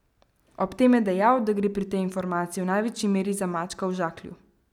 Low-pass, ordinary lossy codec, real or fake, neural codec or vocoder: 19.8 kHz; none; real; none